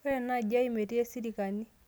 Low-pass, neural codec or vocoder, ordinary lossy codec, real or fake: none; none; none; real